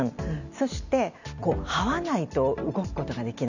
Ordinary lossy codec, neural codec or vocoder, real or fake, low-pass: none; none; real; 7.2 kHz